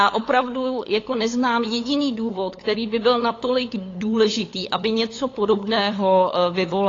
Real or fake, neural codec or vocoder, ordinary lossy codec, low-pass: fake; codec, 16 kHz, 8 kbps, FunCodec, trained on LibriTTS, 25 frames a second; AAC, 32 kbps; 7.2 kHz